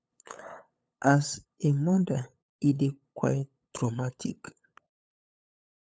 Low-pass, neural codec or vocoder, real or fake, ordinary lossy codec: none; codec, 16 kHz, 8 kbps, FunCodec, trained on LibriTTS, 25 frames a second; fake; none